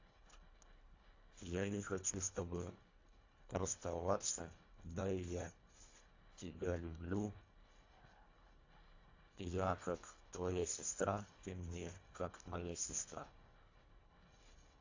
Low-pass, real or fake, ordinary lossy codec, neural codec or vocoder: 7.2 kHz; fake; AAC, 48 kbps; codec, 24 kHz, 1.5 kbps, HILCodec